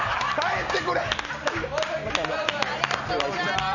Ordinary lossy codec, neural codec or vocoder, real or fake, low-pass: none; none; real; 7.2 kHz